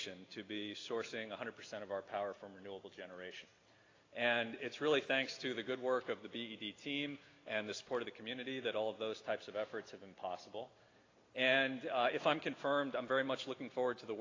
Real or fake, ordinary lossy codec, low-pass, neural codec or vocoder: real; AAC, 32 kbps; 7.2 kHz; none